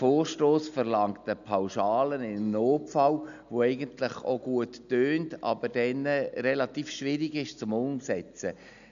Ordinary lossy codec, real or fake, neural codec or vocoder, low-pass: none; real; none; 7.2 kHz